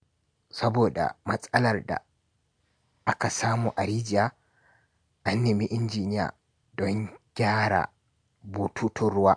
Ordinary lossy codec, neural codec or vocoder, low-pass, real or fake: MP3, 64 kbps; none; 9.9 kHz; real